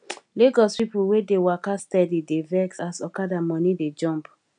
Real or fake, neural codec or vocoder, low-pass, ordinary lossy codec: real; none; 9.9 kHz; none